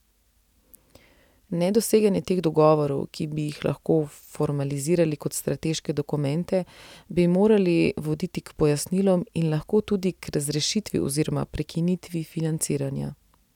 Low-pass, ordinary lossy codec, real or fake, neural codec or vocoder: 19.8 kHz; none; real; none